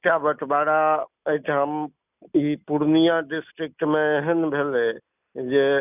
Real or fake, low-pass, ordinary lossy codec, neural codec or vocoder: real; 3.6 kHz; none; none